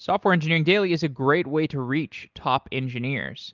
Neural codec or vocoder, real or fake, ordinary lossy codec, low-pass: none; real; Opus, 32 kbps; 7.2 kHz